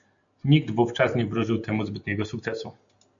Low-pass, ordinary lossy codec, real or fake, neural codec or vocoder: 7.2 kHz; AAC, 64 kbps; real; none